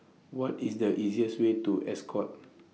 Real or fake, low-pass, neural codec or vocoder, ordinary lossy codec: real; none; none; none